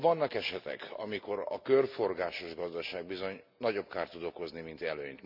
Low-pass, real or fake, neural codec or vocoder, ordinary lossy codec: 5.4 kHz; real; none; MP3, 48 kbps